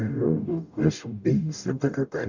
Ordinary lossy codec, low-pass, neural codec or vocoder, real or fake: none; 7.2 kHz; codec, 44.1 kHz, 0.9 kbps, DAC; fake